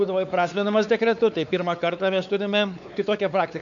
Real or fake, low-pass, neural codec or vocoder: fake; 7.2 kHz; codec, 16 kHz, 4 kbps, X-Codec, WavLM features, trained on Multilingual LibriSpeech